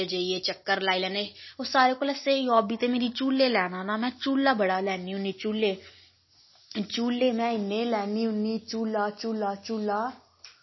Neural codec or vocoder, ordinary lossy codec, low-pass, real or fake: none; MP3, 24 kbps; 7.2 kHz; real